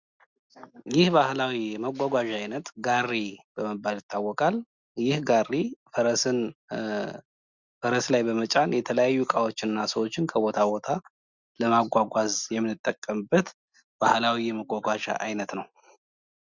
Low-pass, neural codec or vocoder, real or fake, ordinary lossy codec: 7.2 kHz; none; real; Opus, 64 kbps